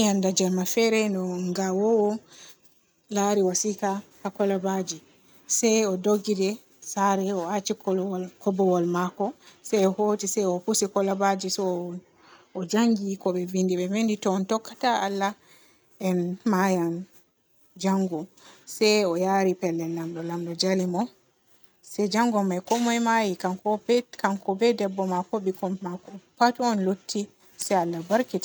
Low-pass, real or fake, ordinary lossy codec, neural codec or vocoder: none; real; none; none